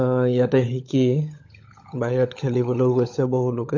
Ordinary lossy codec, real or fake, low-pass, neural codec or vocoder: AAC, 48 kbps; fake; 7.2 kHz; codec, 16 kHz, 16 kbps, FunCodec, trained on LibriTTS, 50 frames a second